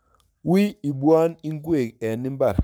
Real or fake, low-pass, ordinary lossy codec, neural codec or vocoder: fake; none; none; codec, 44.1 kHz, 7.8 kbps, Pupu-Codec